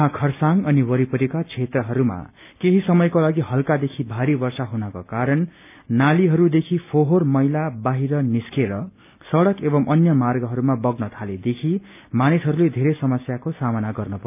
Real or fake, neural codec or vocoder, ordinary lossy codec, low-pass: real; none; none; 3.6 kHz